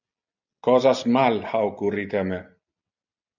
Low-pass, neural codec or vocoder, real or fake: 7.2 kHz; none; real